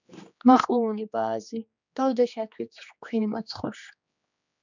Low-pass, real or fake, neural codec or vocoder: 7.2 kHz; fake; codec, 16 kHz, 2 kbps, X-Codec, HuBERT features, trained on general audio